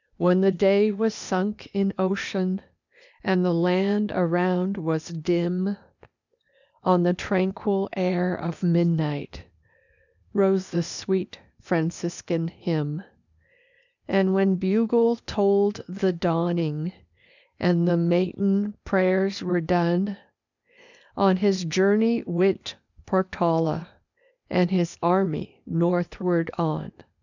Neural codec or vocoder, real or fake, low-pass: codec, 16 kHz, 0.8 kbps, ZipCodec; fake; 7.2 kHz